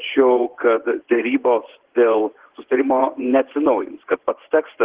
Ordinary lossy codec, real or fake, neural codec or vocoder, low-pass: Opus, 16 kbps; fake; vocoder, 24 kHz, 100 mel bands, Vocos; 3.6 kHz